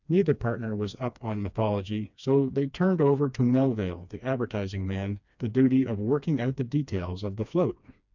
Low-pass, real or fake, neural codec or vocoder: 7.2 kHz; fake; codec, 16 kHz, 2 kbps, FreqCodec, smaller model